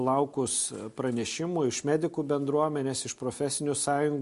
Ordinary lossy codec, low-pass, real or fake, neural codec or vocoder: MP3, 48 kbps; 14.4 kHz; real; none